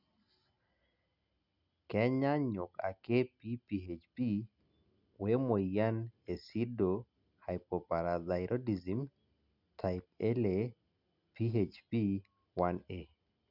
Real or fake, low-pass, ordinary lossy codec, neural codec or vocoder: real; 5.4 kHz; none; none